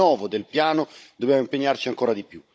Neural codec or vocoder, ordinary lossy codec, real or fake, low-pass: codec, 16 kHz, 16 kbps, FunCodec, trained on Chinese and English, 50 frames a second; none; fake; none